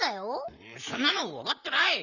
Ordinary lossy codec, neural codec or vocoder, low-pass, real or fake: none; vocoder, 44.1 kHz, 128 mel bands, Pupu-Vocoder; 7.2 kHz; fake